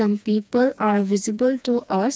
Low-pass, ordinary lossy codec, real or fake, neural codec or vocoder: none; none; fake; codec, 16 kHz, 2 kbps, FreqCodec, smaller model